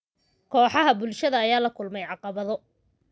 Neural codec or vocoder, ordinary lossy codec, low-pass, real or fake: none; none; none; real